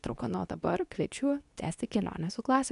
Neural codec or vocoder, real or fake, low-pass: codec, 24 kHz, 0.9 kbps, WavTokenizer, medium speech release version 2; fake; 10.8 kHz